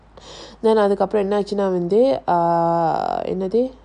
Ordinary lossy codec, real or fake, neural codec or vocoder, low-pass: none; real; none; 9.9 kHz